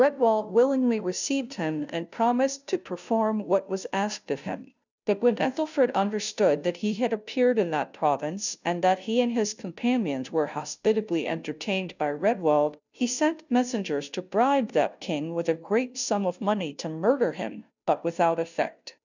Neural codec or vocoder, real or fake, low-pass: codec, 16 kHz, 0.5 kbps, FunCodec, trained on Chinese and English, 25 frames a second; fake; 7.2 kHz